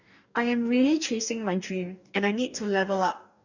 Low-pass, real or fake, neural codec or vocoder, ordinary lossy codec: 7.2 kHz; fake; codec, 44.1 kHz, 2.6 kbps, DAC; none